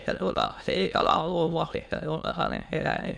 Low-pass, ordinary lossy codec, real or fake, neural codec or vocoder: none; none; fake; autoencoder, 22.05 kHz, a latent of 192 numbers a frame, VITS, trained on many speakers